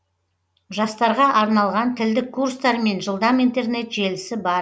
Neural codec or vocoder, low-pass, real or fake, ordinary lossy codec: none; none; real; none